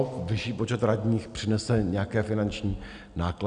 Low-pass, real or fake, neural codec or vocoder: 9.9 kHz; real; none